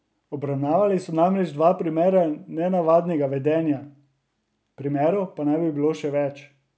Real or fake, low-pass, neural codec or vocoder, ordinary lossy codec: real; none; none; none